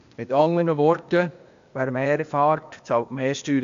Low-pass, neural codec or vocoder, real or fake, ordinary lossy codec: 7.2 kHz; codec, 16 kHz, 0.8 kbps, ZipCodec; fake; AAC, 64 kbps